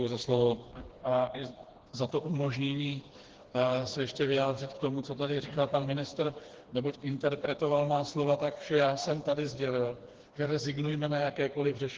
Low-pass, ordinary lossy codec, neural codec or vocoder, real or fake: 7.2 kHz; Opus, 16 kbps; codec, 16 kHz, 2 kbps, FreqCodec, smaller model; fake